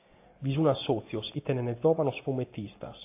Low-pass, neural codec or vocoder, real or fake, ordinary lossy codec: 3.6 kHz; none; real; AAC, 24 kbps